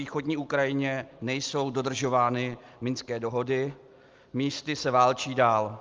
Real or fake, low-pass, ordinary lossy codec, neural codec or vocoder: real; 7.2 kHz; Opus, 32 kbps; none